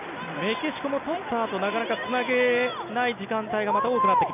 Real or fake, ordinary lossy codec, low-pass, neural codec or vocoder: real; none; 3.6 kHz; none